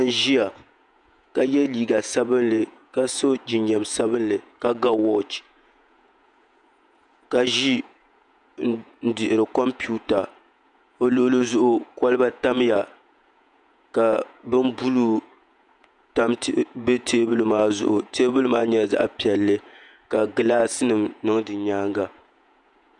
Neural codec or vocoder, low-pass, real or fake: vocoder, 48 kHz, 128 mel bands, Vocos; 10.8 kHz; fake